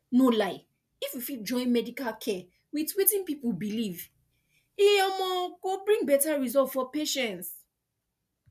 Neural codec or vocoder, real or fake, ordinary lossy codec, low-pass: none; real; none; 14.4 kHz